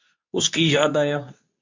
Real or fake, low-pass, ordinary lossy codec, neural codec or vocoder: fake; 7.2 kHz; MP3, 64 kbps; codec, 24 kHz, 0.9 kbps, WavTokenizer, medium speech release version 2